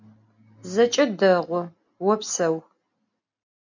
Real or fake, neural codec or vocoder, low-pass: real; none; 7.2 kHz